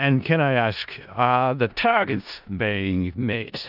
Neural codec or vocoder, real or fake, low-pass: codec, 16 kHz in and 24 kHz out, 0.4 kbps, LongCat-Audio-Codec, four codebook decoder; fake; 5.4 kHz